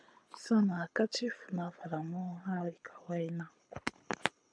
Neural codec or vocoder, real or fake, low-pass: codec, 24 kHz, 6 kbps, HILCodec; fake; 9.9 kHz